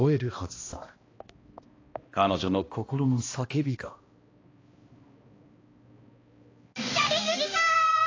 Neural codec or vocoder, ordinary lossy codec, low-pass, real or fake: codec, 16 kHz, 1 kbps, X-Codec, HuBERT features, trained on balanced general audio; AAC, 32 kbps; 7.2 kHz; fake